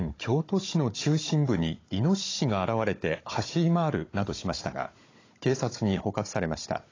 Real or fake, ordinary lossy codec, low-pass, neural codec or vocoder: fake; AAC, 32 kbps; 7.2 kHz; codec, 16 kHz, 16 kbps, FunCodec, trained on Chinese and English, 50 frames a second